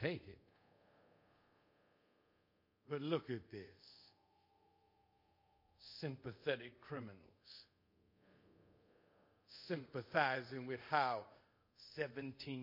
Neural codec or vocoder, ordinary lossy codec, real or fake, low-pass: codec, 24 kHz, 0.5 kbps, DualCodec; MP3, 32 kbps; fake; 5.4 kHz